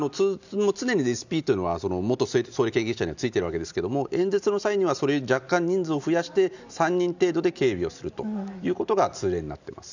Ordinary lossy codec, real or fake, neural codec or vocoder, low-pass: none; real; none; 7.2 kHz